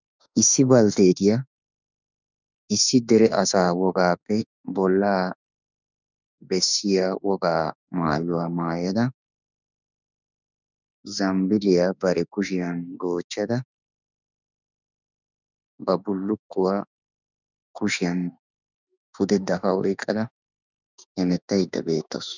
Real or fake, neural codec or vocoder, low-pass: fake; autoencoder, 48 kHz, 32 numbers a frame, DAC-VAE, trained on Japanese speech; 7.2 kHz